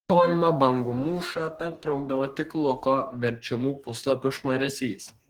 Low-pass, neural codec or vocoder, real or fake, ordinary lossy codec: 19.8 kHz; codec, 44.1 kHz, 2.6 kbps, DAC; fake; Opus, 24 kbps